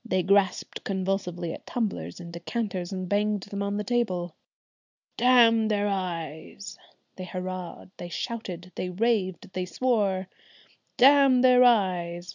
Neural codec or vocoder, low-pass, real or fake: none; 7.2 kHz; real